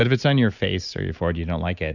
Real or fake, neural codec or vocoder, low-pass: real; none; 7.2 kHz